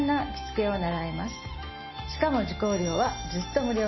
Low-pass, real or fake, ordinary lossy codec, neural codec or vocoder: 7.2 kHz; real; MP3, 24 kbps; none